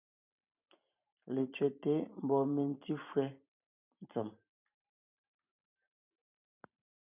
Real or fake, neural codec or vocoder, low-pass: fake; vocoder, 44.1 kHz, 128 mel bands every 512 samples, BigVGAN v2; 3.6 kHz